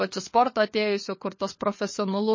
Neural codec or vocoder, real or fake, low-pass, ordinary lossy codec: codec, 16 kHz, 4 kbps, FunCodec, trained on LibriTTS, 50 frames a second; fake; 7.2 kHz; MP3, 32 kbps